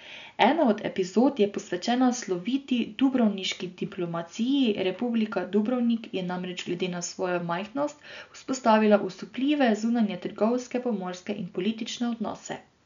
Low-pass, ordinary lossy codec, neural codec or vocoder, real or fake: 7.2 kHz; none; none; real